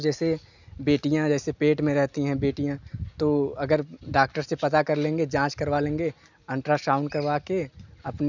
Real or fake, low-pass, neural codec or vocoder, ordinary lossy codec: real; 7.2 kHz; none; none